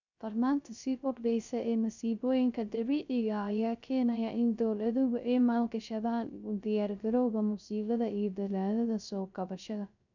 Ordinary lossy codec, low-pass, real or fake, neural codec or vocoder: none; 7.2 kHz; fake; codec, 16 kHz, 0.3 kbps, FocalCodec